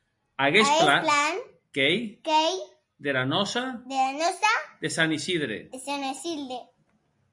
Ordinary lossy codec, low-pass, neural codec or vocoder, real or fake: MP3, 64 kbps; 10.8 kHz; none; real